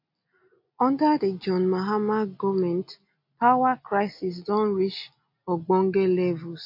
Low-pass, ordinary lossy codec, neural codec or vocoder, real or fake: 5.4 kHz; MP3, 32 kbps; none; real